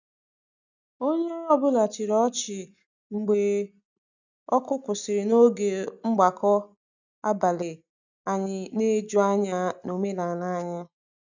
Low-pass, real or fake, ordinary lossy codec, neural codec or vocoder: 7.2 kHz; real; none; none